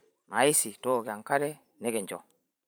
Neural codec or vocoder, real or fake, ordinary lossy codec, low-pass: vocoder, 44.1 kHz, 128 mel bands every 512 samples, BigVGAN v2; fake; none; none